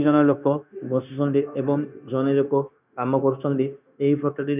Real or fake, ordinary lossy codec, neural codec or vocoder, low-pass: fake; none; codec, 16 kHz, 0.9 kbps, LongCat-Audio-Codec; 3.6 kHz